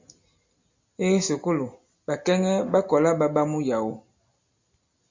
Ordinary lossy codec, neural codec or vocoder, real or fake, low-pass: MP3, 64 kbps; none; real; 7.2 kHz